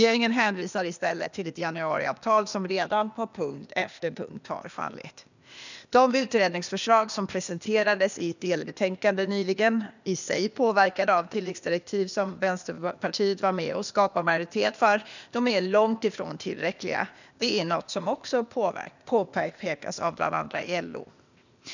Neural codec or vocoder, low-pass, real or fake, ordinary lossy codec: codec, 16 kHz, 0.8 kbps, ZipCodec; 7.2 kHz; fake; none